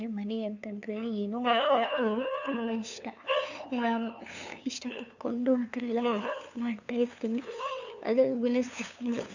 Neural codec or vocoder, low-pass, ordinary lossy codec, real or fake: codec, 16 kHz, 2 kbps, FunCodec, trained on LibriTTS, 25 frames a second; 7.2 kHz; none; fake